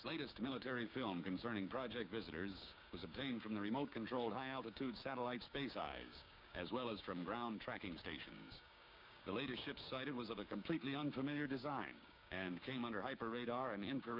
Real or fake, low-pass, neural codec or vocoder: fake; 5.4 kHz; codec, 16 kHz, 6 kbps, DAC